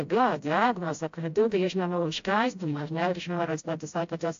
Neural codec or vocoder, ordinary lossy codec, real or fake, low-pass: codec, 16 kHz, 0.5 kbps, FreqCodec, smaller model; AAC, 48 kbps; fake; 7.2 kHz